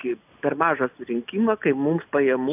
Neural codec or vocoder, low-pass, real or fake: none; 3.6 kHz; real